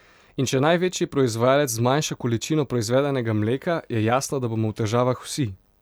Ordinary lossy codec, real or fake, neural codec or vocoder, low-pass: none; real; none; none